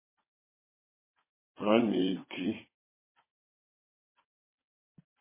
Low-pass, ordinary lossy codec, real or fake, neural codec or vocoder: 3.6 kHz; MP3, 16 kbps; fake; vocoder, 22.05 kHz, 80 mel bands, WaveNeXt